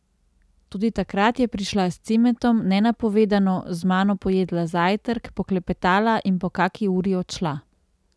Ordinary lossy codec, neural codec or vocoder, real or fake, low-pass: none; none; real; none